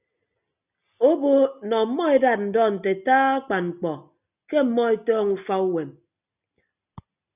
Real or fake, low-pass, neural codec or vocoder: real; 3.6 kHz; none